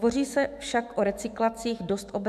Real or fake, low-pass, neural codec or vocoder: fake; 14.4 kHz; autoencoder, 48 kHz, 128 numbers a frame, DAC-VAE, trained on Japanese speech